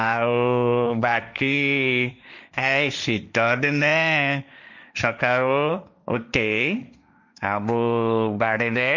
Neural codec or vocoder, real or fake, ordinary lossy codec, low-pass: codec, 16 kHz, 1.1 kbps, Voila-Tokenizer; fake; none; 7.2 kHz